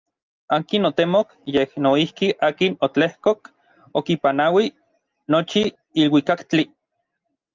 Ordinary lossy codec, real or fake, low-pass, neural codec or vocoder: Opus, 24 kbps; real; 7.2 kHz; none